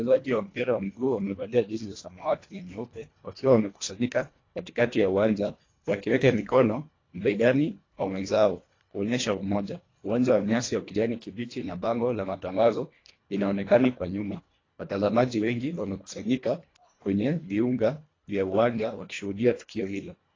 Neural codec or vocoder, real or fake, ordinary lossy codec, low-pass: codec, 24 kHz, 1.5 kbps, HILCodec; fake; AAC, 32 kbps; 7.2 kHz